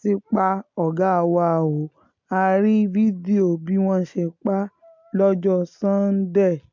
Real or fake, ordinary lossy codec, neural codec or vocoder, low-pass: real; MP3, 48 kbps; none; 7.2 kHz